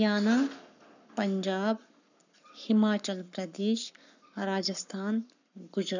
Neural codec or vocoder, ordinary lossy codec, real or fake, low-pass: codec, 44.1 kHz, 7.8 kbps, Pupu-Codec; none; fake; 7.2 kHz